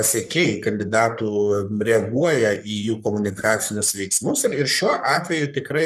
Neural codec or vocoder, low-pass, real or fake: codec, 44.1 kHz, 3.4 kbps, Pupu-Codec; 14.4 kHz; fake